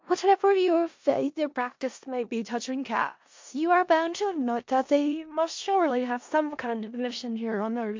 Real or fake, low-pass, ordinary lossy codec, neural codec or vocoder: fake; 7.2 kHz; MP3, 48 kbps; codec, 16 kHz in and 24 kHz out, 0.4 kbps, LongCat-Audio-Codec, four codebook decoder